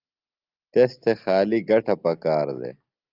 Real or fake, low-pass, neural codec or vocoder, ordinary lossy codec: real; 5.4 kHz; none; Opus, 24 kbps